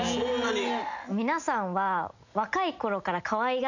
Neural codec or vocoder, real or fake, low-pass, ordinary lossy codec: none; real; 7.2 kHz; none